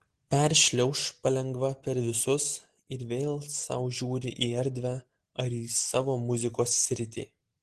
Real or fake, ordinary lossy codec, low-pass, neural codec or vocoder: real; Opus, 16 kbps; 10.8 kHz; none